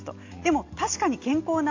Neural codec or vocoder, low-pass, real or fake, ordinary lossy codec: none; 7.2 kHz; real; AAC, 48 kbps